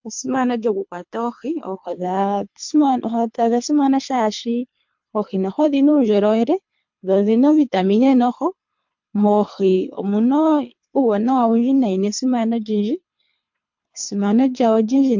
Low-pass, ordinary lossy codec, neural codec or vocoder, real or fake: 7.2 kHz; MP3, 48 kbps; codec, 24 kHz, 3 kbps, HILCodec; fake